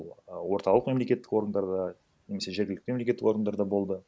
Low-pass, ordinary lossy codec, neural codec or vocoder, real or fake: none; none; none; real